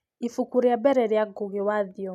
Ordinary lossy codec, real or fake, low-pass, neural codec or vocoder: none; real; 14.4 kHz; none